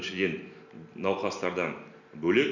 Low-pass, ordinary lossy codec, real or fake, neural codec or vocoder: 7.2 kHz; none; real; none